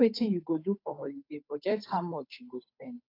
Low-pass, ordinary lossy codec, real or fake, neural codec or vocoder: 5.4 kHz; AAC, 32 kbps; fake; codec, 16 kHz, 8 kbps, FunCodec, trained on Chinese and English, 25 frames a second